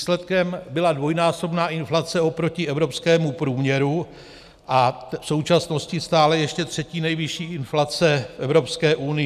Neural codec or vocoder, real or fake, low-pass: none; real; 14.4 kHz